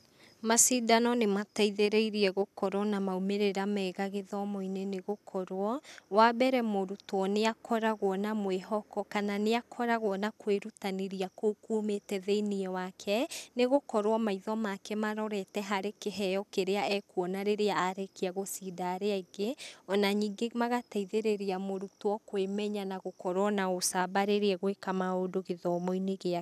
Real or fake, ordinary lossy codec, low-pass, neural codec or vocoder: real; none; 14.4 kHz; none